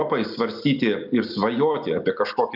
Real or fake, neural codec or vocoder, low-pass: real; none; 5.4 kHz